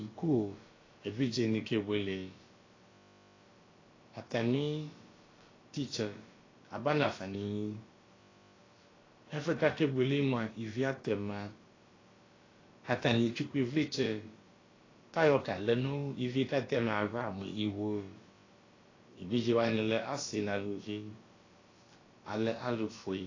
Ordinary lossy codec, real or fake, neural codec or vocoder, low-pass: AAC, 32 kbps; fake; codec, 16 kHz, about 1 kbps, DyCAST, with the encoder's durations; 7.2 kHz